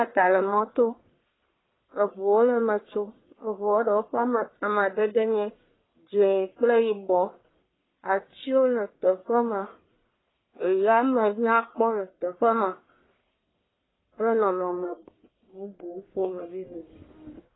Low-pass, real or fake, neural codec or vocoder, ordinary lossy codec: 7.2 kHz; fake; codec, 44.1 kHz, 1.7 kbps, Pupu-Codec; AAC, 16 kbps